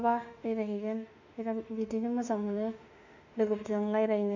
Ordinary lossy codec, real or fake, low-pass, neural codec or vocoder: none; fake; 7.2 kHz; autoencoder, 48 kHz, 32 numbers a frame, DAC-VAE, trained on Japanese speech